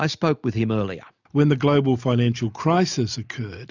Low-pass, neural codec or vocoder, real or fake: 7.2 kHz; none; real